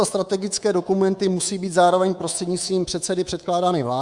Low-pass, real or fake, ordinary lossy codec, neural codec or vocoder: 10.8 kHz; fake; Opus, 64 kbps; codec, 24 kHz, 3.1 kbps, DualCodec